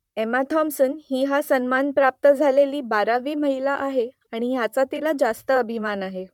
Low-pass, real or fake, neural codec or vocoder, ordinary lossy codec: 19.8 kHz; fake; vocoder, 44.1 kHz, 128 mel bands, Pupu-Vocoder; MP3, 96 kbps